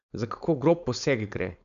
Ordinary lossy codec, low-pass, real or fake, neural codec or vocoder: none; 7.2 kHz; fake; codec, 16 kHz, 4.8 kbps, FACodec